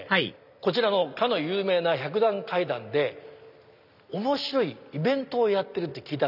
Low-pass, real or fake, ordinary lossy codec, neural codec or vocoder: 5.4 kHz; real; none; none